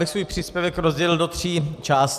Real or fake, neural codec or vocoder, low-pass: real; none; 14.4 kHz